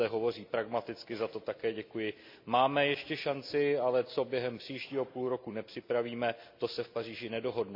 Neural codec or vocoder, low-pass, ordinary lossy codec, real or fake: none; 5.4 kHz; MP3, 48 kbps; real